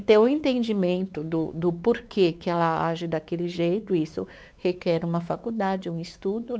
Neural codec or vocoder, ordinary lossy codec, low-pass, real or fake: codec, 16 kHz, 4 kbps, X-Codec, WavLM features, trained on Multilingual LibriSpeech; none; none; fake